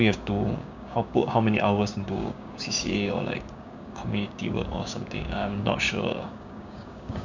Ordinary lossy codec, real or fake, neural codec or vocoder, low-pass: none; fake; codec, 16 kHz, 6 kbps, DAC; 7.2 kHz